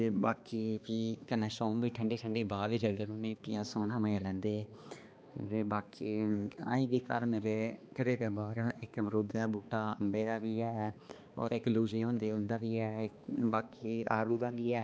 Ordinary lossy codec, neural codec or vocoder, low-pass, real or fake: none; codec, 16 kHz, 2 kbps, X-Codec, HuBERT features, trained on balanced general audio; none; fake